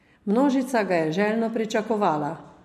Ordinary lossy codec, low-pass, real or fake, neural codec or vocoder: MP3, 64 kbps; 14.4 kHz; real; none